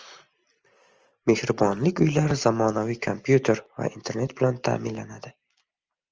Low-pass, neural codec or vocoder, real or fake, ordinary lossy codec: 7.2 kHz; none; real; Opus, 24 kbps